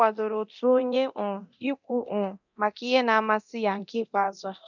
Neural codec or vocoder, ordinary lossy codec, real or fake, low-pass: codec, 24 kHz, 0.9 kbps, DualCodec; none; fake; 7.2 kHz